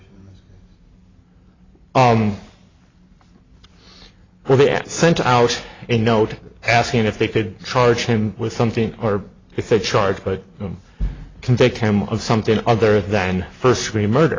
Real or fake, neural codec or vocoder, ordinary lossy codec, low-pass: real; none; AAC, 32 kbps; 7.2 kHz